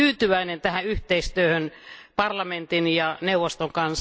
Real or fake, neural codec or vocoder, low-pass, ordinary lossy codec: real; none; none; none